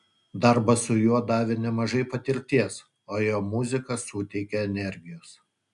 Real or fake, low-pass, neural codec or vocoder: real; 10.8 kHz; none